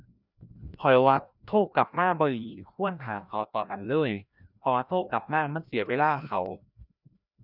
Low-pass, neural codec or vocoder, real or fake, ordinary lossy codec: 5.4 kHz; codec, 16 kHz, 1 kbps, FreqCodec, larger model; fake; none